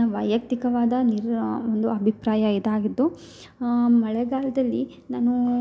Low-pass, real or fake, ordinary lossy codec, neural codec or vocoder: none; real; none; none